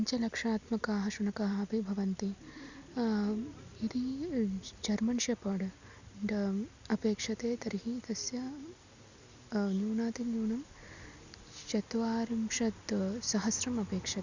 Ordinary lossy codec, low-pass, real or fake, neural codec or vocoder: Opus, 64 kbps; 7.2 kHz; real; none